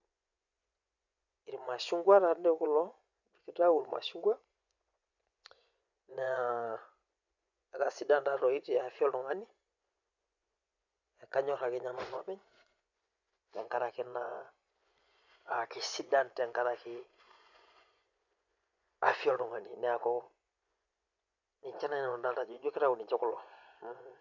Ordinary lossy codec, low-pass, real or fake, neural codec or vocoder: none; 7.2 kHz; fake; vocoder, 24 kHz, 100 mel bands, Vocos